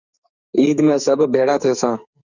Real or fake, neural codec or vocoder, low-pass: fake; codec, 44.1 kHz, 2.6 kbps, SNAC; 7.2 kHz